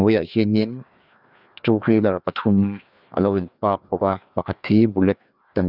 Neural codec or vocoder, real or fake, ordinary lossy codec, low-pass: codec, 16 kHz, 2 kbps, FreqCodec, larger model; fake; none; 5.4 kHz